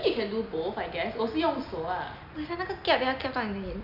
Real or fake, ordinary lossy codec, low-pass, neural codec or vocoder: real; none; 5.4 kHz; none